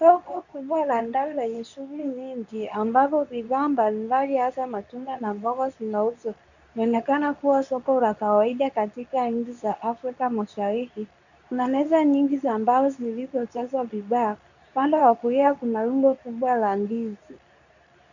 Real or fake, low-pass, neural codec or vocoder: fake; 7.2 kHz; codec, 24 kHz, 0.9 kbps, WavTokenizer, medium speech release version 2